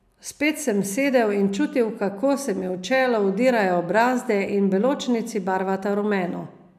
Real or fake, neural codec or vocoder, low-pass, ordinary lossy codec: real; none; 14.4 kHz; none